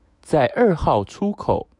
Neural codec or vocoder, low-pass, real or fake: autoencoder, 48 kHz, 128 numbers a frame, DAC-VAE, trained on Japanese speech; 10.8 kHz; fake